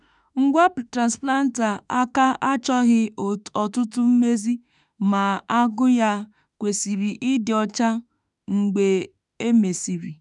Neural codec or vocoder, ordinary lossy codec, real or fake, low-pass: autoencoder, 48 kHz, 32 numbers a frame, DAC-VAE, trained on Japanese speech; none; fake; 10.8 kHz